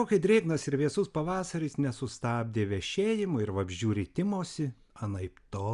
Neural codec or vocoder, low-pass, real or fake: none; 10.8 kHz; real